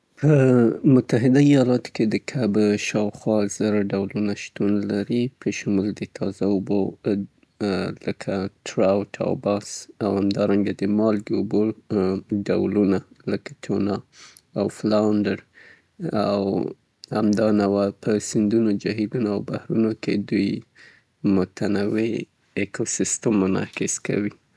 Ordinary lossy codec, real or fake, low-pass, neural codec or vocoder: none; real; none; none